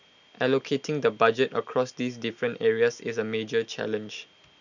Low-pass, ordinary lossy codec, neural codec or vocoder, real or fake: 7.2 kHz; none; none; real